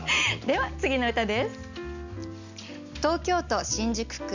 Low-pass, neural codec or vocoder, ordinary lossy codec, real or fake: 7.2 kHz; none; none; real